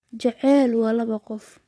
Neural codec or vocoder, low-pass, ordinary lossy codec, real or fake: vocoder, 22.05 kHz, 80 mel bands, WaveNeXt; none; none; fake